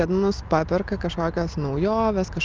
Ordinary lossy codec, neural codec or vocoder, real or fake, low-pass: Opus, 24 kbps; none; real; 7.2 kHz